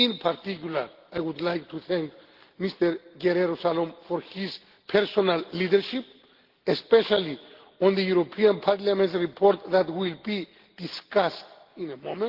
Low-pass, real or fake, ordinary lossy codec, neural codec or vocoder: 5.4 kHz; real; Opus, 16 kbps; none